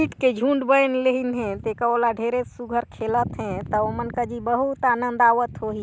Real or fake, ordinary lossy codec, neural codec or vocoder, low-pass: real; none; none; none